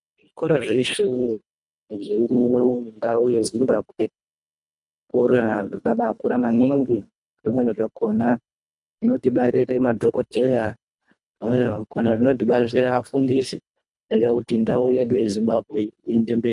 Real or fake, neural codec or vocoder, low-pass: fake; codec, 24 kHz, 1.5 kbps, HILCodec; 10.8 kHz